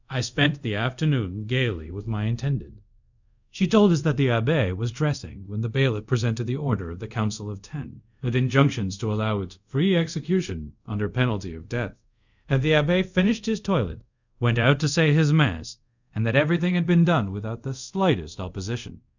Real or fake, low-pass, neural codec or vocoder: fake; 7.2 kHz; codec, 24 kHz, 0.5 kbps, DualCodec